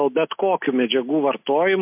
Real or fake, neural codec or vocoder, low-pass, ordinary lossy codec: real; none; 3.6 kHz; MP3, 32 kbps